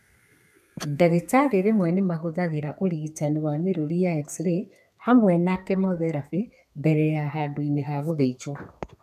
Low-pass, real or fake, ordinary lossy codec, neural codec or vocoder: 14.4 kHz; fake; none; codec, 32 kHz, 1.9 kbps, SNAC